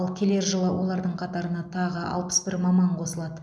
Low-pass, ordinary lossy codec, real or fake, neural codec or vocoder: none; none; real; none